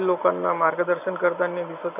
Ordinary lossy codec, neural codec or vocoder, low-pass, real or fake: none; none; 3.6 kHz; real